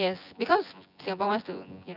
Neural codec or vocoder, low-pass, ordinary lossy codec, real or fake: vocoder, 24 kHz, 100 mel bands, Vocos; 5.4 kHz; none; fake